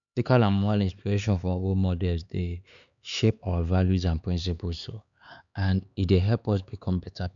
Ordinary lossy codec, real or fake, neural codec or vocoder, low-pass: none; fake; codec, 16 kHz, 4 kbps, X-Codec, HuBERT features, trained on LibriSpeech; 7.2 kHz